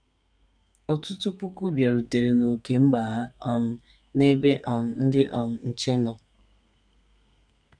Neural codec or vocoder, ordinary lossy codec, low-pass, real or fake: codec, 32 kHz, 1.9 kbps, SNAC; none; 9.9 kHz; fake